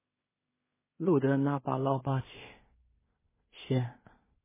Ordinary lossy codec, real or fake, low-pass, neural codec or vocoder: MP3, 16 kbps; fake; 3.6 kHz; codec, 16 kHz in and 24 kHz out, 0.4 kbps, LongCat-Audio-Codec, two codebook decoder